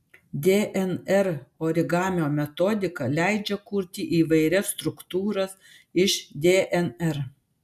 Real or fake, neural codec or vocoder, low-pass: real; none; 14.4 kHz